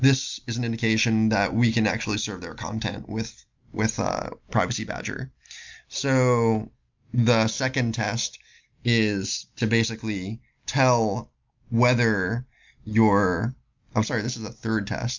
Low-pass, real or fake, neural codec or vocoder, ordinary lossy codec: 7.2 kHz; real; none; MP3, 64 kbps